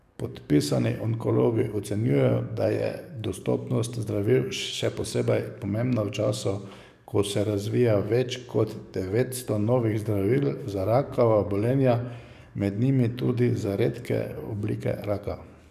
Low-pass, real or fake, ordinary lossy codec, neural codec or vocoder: 14.4 kHz; fake; none; codec, 44.1 kHz, 7.8 kbps, DAC